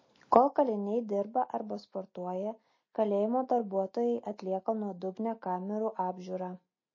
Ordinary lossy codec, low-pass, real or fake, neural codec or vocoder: MP3, 32 kbps; 7.2 kHz; real; none